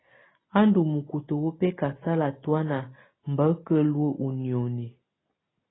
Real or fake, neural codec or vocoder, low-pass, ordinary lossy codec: real; none; 7.2 kHz; AAC, 16 kbps